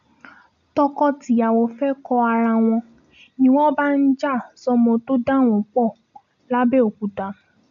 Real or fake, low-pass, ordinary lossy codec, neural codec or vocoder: real; 7.2 kHz; none; none